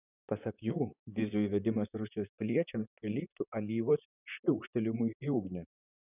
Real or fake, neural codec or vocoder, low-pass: fake; vocoder, 44.1 kHz, 128 mel bands, Pupu-Vocoder; 3.6 kHz